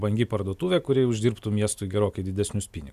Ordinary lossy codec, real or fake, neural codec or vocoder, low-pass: Opus, 64 kbps; real; none; 14.4 kHz